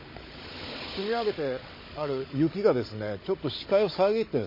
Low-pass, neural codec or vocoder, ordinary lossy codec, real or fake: 5.4 kHz; codec, 16 kHz, 16 kbps, FunCodec, trained on Chinese and English, 50 frames a second; MP3, 24 kbps; fake